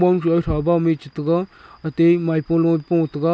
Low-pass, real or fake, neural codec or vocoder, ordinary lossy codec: none; real; none; none